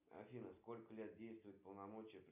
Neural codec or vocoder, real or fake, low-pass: none; real; 3.6 kHz